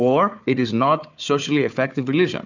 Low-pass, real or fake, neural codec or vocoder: 7.2 kHz; fake; codec, 16 kHz, 4 kbps, FunCodec, trained on Chinese and English, 50 frames a second